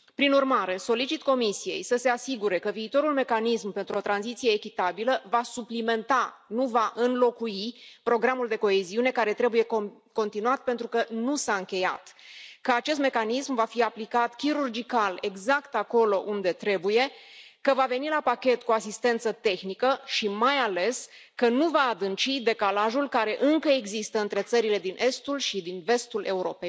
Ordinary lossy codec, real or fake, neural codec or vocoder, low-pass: none; real; none; none